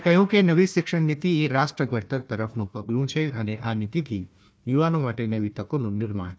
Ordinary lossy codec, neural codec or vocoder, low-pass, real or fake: none; codec, 16 kHz, 1 kbps, FunCodec, trained on Chinese and English, 50 frames a second; none; fake